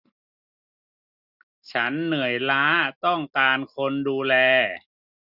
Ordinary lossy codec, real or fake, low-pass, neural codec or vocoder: none; real; 5.4 kHz; none